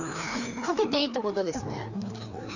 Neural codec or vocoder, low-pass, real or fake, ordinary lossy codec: codec, 16 kHz, 2 kbps, FreqCodec, larger model; 7.2 kHz; fake; none